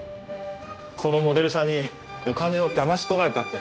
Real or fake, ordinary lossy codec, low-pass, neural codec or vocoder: fake; none; none; codec, 16 kHz, 1 kbps, X-Codec, HuBERT features, trained on general audio